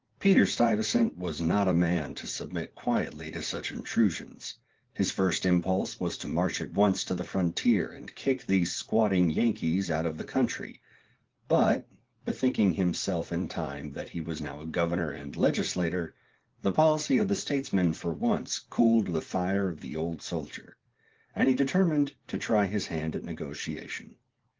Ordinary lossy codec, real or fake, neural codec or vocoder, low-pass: Opus, 16 kbps; fake; vocoder, 44.1 kHz, 80 mel bands, Vocos; 7.2 kHz